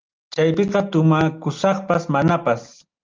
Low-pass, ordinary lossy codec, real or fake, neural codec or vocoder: 7.2 kHz; Opus, 24 kbps; real; none